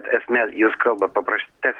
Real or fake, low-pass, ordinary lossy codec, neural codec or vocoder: real; 19.8 kHz; Opus, 16 kbps; none